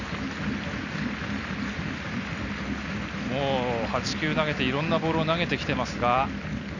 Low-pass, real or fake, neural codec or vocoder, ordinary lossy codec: 7.2 kHz; real; none; none